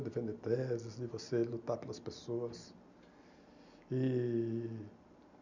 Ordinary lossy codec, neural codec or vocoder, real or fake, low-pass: none; none; real; 7.2 kHz